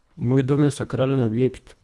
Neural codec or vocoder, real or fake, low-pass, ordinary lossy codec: codec, 24 kHz, 1.5 kbps, HILCodec; fake; none; none